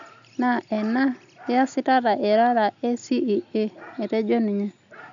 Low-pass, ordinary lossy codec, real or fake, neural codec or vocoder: 7.2 kHz; none; real; none